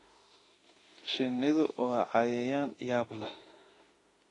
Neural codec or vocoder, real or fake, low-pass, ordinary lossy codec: autoencoder, 48 kHz, 32 numbers a frame, DAC-VAE, trained on Japanese speech; fake; 10.8 kHz; AAC, 32 kbps